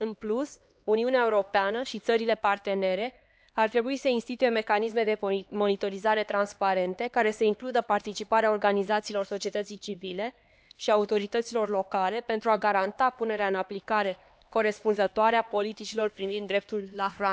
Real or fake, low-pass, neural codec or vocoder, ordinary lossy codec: fake; none; codec, 16 kHz, 2 kbps, X-Codec, HuBERT features, trained on LibriSpeech; none